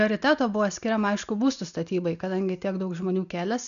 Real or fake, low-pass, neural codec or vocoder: real; 7.2 kHz; none